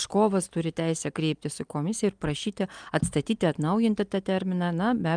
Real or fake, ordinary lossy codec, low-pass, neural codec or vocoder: real; Opus, 32 kbps; 9.9 kHz; none